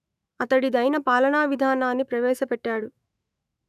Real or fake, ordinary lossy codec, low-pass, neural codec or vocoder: fake; none; 14.4 kHz; autoencoder, 48 kHz, 128 numbers a frame, DAC-VAE, trained on Japanese speech